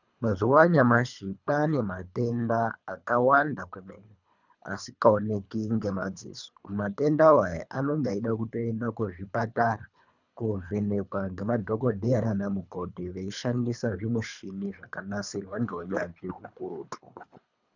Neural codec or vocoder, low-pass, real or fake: codec, 24 kHz, 3 kbps, HILCodec; 7.2 kHz; fake